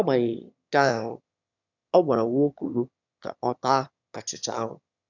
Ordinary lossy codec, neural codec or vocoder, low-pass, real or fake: none; autoencoder, 22.05 kHz, a latent of 192 numbers a frame, VITS, trained on one speaker; 7.2 kHz; fake